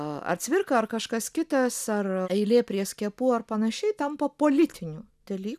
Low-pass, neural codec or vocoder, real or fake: 14.4 kHz; none; real